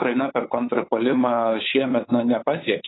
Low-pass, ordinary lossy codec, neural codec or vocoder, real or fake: 7.2 kHz; AAC, 16 kbps; codec, 16 kHz, 8 kbps, FunCodec, trained on LibriTTS, 25 frames a second; fake